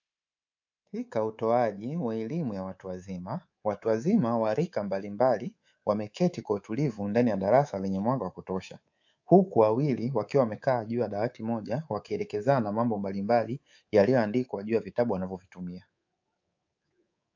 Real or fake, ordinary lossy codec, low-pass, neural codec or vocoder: fake; AAC, 48 kbps; 7.2 kHz; codec, 24 kHz, 3.1 kbps, DualCodec